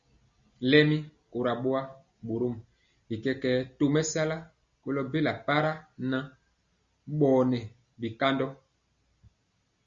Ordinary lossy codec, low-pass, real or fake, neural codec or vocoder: Opus, 64 kbps; 7.2 kHz; real; none